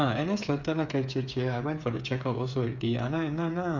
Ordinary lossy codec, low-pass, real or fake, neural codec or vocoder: none; 7.2 kHz; fake; codec, 16 kHz, 8 kbps, FreqCodec, smaller model